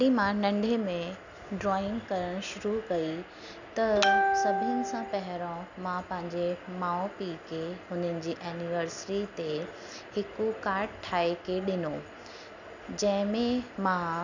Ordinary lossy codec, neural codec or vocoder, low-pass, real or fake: none; none; 7.2 kHz; real